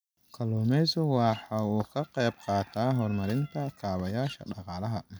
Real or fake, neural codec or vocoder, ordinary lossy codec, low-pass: real; none; none; none